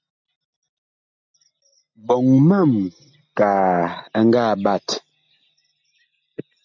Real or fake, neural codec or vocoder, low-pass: real; none; 7.2 kHz